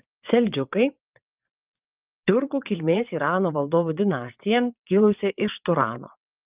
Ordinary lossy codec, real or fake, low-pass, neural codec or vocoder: Opus, 24 kbps; fake; 3.6 kHz; vocoder, 22.05 kHz, 80 mel bands, WaveNeXt